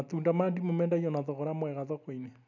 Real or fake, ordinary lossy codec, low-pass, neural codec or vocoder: real; none; 7.2 kHz; none